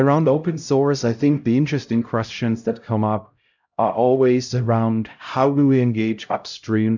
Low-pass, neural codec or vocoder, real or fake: 7.2 kHz; codec, 16 kHz, 0.5 kbps, X-Codec, HuBERT features, trained on LibriSpeech; fake